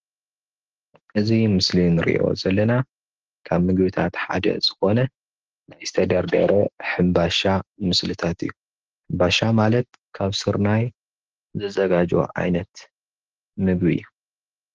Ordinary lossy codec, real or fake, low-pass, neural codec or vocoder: Opus, 16 kbps; real; 7.2 kHz; none